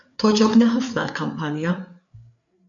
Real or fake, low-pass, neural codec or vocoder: fake; 7.2 kHz; codec, 16 kHz, 4 kbps, FreqCodec, larger model